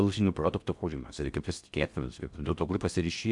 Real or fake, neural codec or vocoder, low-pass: fake; codec, 16 kHz in and 24 kHz out, 0.6 kbps, FocalCodec, streaming, 4096 codes; 10.8 kHz